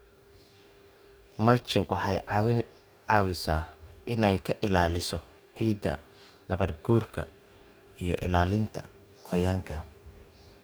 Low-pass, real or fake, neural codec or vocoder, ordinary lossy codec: none; fake; codec, 44.1 kHz, 2.6 kbps, DAC; none